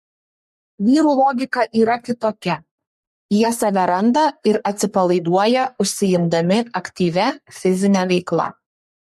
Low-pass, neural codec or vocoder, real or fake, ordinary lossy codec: 14.4 kHz; codec, 44.1 kHz, 3.4 kbps, Pupu-Codec; fake; MP3, 64 kbps